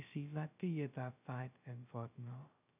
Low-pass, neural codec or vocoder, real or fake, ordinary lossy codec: 3.6 kHz; codec, 16 kHz, 0.2 kbps, FocalCodec; fake; none